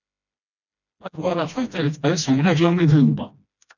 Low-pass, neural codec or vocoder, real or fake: 7.2 kHz; codec, 16 kHz, 1 kbps, FreqCodec, smaller model; fake